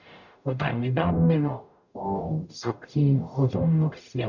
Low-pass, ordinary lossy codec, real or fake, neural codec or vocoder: 7.2 kHz; none; fake; codec, 44.1 kHz, 0.9 kbps, DAC